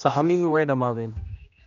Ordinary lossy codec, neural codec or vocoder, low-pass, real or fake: none; codec, 16 kHz, 1 kbps, X-Codec, HuBERT features, trained on general audio; 7.2 kHz; fake